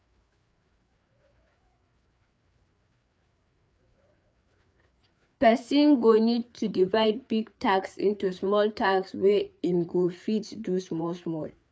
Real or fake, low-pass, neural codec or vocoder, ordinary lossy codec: fake; none; codec, 16 kHz, 4 kbps, FreqCodec, larger model; none